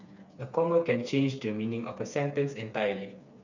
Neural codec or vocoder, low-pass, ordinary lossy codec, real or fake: codec, 16 kHz, 4 kbps, FreqCodec, smaller model; 7.2 kHz; none; fake